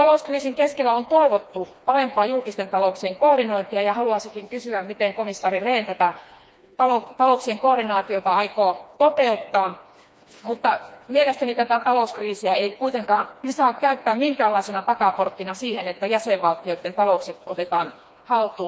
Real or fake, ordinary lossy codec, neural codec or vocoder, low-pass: fake; none; codec, 16 kHz, 2 kbps, FreqCodec, smaller model; none